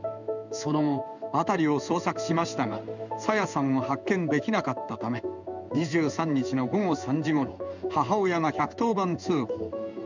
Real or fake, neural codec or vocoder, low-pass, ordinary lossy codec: fake; codec, 16 kHz in and 24 kHz out, 1 kbps, XY-Tokenizer; 7.2 kHz; none